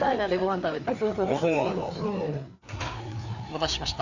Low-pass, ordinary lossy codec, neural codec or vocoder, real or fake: 7.2 kHz; none; codec, 16 kHz, 4 kbps, FreqCodec, larger model; fake